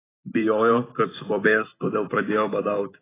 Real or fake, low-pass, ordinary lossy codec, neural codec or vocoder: fake; 3.6 kHz; AAC, 16 kbps; vocoder, 44.1 kHz, 128 mel bands every 512 samples, BigVGAN v2